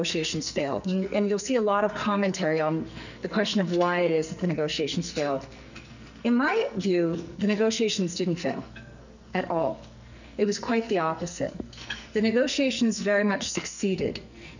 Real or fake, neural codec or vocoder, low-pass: fake; codec, 44.1 kHz, 2.6 kbps, SNAC; 7.2 kHz